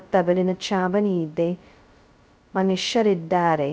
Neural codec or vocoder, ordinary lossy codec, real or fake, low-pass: codec, 16 kHz, 0.2 kbps, FocalCodec; none; fake; none